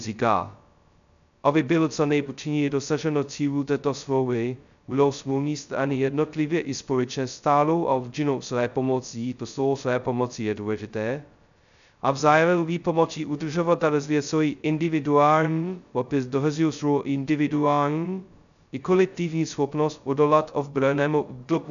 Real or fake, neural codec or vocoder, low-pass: fake; codec, 16 kHz, 0.2 kbps, FocalCodec; 7.2 kHz